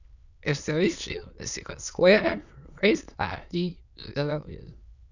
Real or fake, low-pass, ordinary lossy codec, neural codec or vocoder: fake; 7.2 kHz; none; autoencoder, 22.05 kHz, a latent of 192 numbers a frame, VITS, trained on many speakers